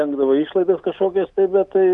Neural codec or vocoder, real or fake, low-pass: none; real; 10.8 kHz